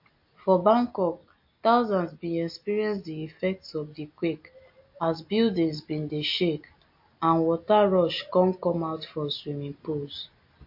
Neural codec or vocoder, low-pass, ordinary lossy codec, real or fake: none; 5.4 kHz; MP3, 32 kbps; real